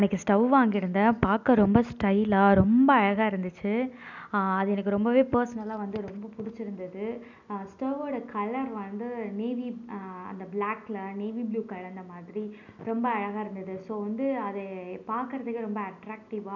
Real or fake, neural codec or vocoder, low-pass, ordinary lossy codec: real; none; 7.2 kHz; none